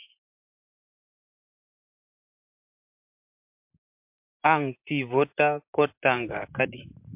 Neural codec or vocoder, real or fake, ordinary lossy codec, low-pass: vocoder, 44.1 kHz, 128 mel bands, Pupu-Vocoder; fake; MP3, 32 kbps; 3.6 kHz